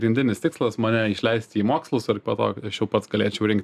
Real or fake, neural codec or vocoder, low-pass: real; none; 14.4 kHz